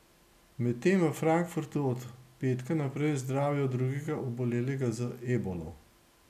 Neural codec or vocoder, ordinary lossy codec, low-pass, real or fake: none; none; 14.4 kHz; real